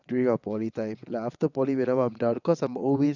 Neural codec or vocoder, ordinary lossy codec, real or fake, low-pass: vocoder, 22.05 kHz, 80 mel bands, WaveNeXt; none; fake; 7.2 kHz